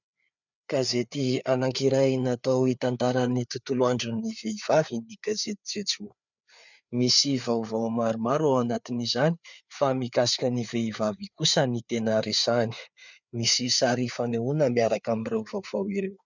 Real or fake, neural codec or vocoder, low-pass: fake; codec, 16 kHz, 4 kbps, FreqCodec, larger model; 7.2 kHz